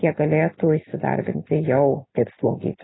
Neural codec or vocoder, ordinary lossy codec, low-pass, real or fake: none; AAC, 16 kbps; 7.2 kHz; real